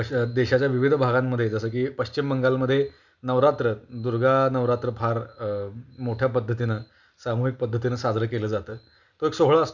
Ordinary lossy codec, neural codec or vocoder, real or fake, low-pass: none; none; real; 7.2 kHz